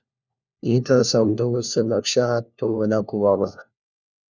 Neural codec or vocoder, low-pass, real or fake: codec, 16 kHz, 1 kbps, FunCodec, trained on LibriTTS, 50 frames a second; 7.2 kHz; fake